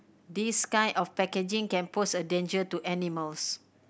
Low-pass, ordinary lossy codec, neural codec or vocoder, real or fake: none; none; none; real